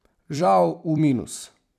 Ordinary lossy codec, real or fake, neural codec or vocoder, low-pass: none; fake; vocoder, 44.1 kHz, 128 mel bands every 256 samples, BigVGAN v2; 14.4 kHz